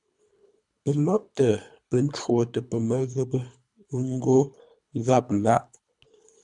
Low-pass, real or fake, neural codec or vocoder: 10.8 kHz; fake; codec, 24 kHz, 3 kbps, HILCodec